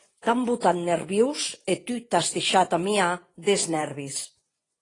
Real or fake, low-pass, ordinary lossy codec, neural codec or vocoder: fake; 10.8 kHz; AAC, 32 kbps; vocoder, 24 kHz, 100 mel bands, Vocos